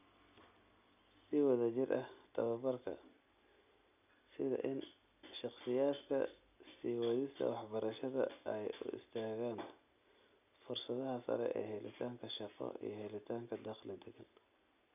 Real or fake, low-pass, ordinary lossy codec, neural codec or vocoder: real; 3.6 kHz; none; none